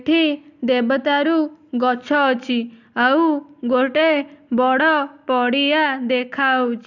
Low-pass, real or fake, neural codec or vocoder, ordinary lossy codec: 7.2 kHz; real; none; none